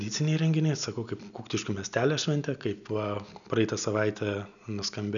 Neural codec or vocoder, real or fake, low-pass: none; real; 7.2 kHz